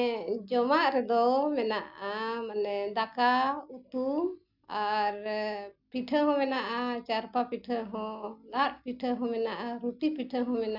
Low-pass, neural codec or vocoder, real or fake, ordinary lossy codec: 5.4 kHz; none; real; none